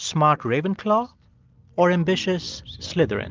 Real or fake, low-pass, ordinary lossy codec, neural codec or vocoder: real; 7.2 kHz; Opus, 24 kbps; none